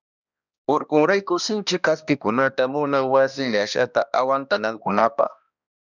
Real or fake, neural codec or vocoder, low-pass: fake; codec, 16 kHz, 1 kbps, X-Codec, HuBERT features, trained on balanced general audio; 7.2 kHz